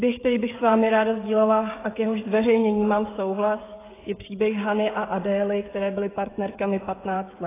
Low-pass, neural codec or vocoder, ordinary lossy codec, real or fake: 3.6 kHz; codec, 16 kHz, 16 kbps, FreqCodec, smaller model; AAC, 16 kbps; fake